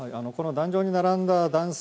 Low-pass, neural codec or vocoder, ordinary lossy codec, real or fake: none; none; none; real